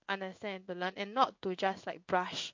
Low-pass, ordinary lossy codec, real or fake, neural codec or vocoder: 7.2 kHz; MP3, 48 kbps; fake; codec, 16 kHz in and 24 kHz out, 1 kbps, XY-Tokenizer